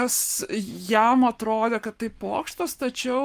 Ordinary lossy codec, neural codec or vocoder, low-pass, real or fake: Opus, 32 kbps; codec, 44.1 kHz, 7.8 kbps, Pupu-Codec; 14.4 kHz; fake